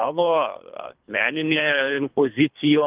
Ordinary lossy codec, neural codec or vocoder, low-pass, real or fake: Opus, 24 kbps; codec, 24 kHz, 3 kbps, HILCodec; 3.6 kHz; fake